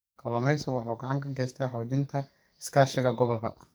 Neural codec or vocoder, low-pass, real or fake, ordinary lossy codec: codec, 44.1 kHz, 2.6 kbps, SNAC; none; fake; none